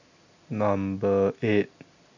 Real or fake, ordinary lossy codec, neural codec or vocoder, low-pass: real; none; none; 7.2 kHz